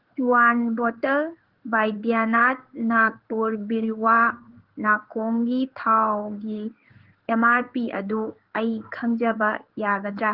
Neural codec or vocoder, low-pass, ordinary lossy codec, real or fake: codec, 16 kHz in and 24 kHz out, 1 kbps, XY-Tokenizer; 5.4 kHz; Opus, 16 kbps; fake